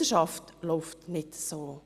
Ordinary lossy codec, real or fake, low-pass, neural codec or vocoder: Opus, 64 kbps; real; 14.4 kHz; none